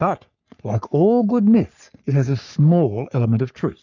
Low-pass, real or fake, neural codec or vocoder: 7.2 kHz; fake; codec, 44.1 kHz, 3.4 kbps, Pupu-Codec